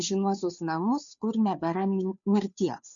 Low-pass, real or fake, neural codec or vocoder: 7.2 kHz; fake; codec, 16 kHz, 2 kbps, FunCodec, trained on Chinese and English, 25 frames a second